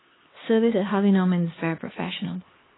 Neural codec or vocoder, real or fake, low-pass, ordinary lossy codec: codec, 16 kHz, 2 kbps, X-Codec, HuBERT features, trained on LibriSpeech; fake; 7.2 kHz; AAC, 16 kbps